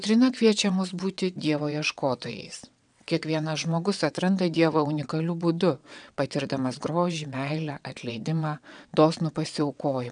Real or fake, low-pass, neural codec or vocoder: fake; 9.9 kHz; vocoder, 22.05 kHz, 80 mel bands, WaveNeXt